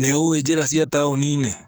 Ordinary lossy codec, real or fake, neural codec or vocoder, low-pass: none; fake; codec, 44.1 kHz, 2.6 kbps, SNAC; none